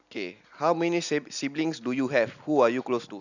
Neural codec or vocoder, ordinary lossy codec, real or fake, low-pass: none; none; real; 7.2 kHz